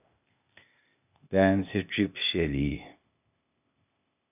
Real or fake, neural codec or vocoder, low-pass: fake; codec, 16 kHz, 0.8 kbps, ZipCodec; 3.6 kHz